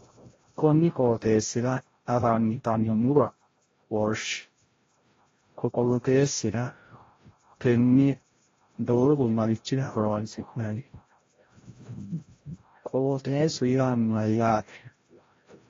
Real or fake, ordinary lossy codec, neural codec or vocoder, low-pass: fake; AAC, 32 kbps; codec, 16 kHz, 0.5 kbps, FreqCodec, larger model; 7.2 kHz